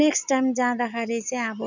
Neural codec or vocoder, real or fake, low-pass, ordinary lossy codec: none; real; 7.2 kHz; none